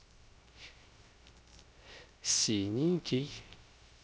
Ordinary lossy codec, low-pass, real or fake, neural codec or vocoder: none; none; fake; codec, 16 kHz, 0.3 kbps, FocalCodec